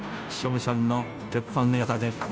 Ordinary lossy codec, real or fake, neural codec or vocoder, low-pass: none; fake; codec, 16 kHz, 0.5 kbps, FunCodec, trained on Chinese and English, 25 frames a second; none